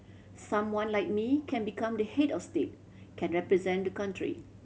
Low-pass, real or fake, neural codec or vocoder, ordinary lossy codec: none; real; none; none